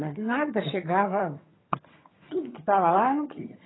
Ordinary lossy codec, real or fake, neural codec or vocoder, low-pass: AAC, 16 kbps; fake; vocoder, 22.05 kHz, 80 mel bands, HiFi-GAN; 7.2 kHz